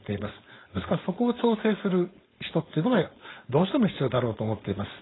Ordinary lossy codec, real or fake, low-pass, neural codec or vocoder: AAC, 16 kbps; fake; 7.2 kHz; codec, 16 kHz, 4.8 kbps, FACodec